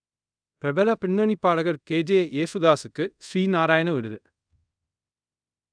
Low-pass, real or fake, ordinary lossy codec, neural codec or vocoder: 9.9 kHz; fake; none; codec, 24 kHz, 0.5 kbps, DualCodec